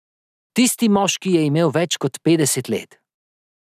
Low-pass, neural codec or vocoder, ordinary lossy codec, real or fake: 14.4 kHz; none; none; real